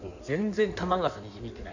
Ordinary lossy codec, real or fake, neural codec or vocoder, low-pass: none; fake; codec, 16 kHz in and 24 kHz out, 1.1 kbps, FireRedTTS-2 codec; 7.2 kHz